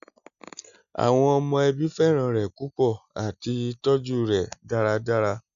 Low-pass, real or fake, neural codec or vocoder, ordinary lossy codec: 7.2 kHz; real; none; none